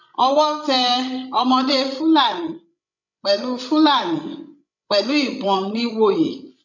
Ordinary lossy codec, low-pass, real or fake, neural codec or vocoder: none; 7.2 kHz; fake; codec, 16 kHz, 16 kbps, FreqCodec, larger model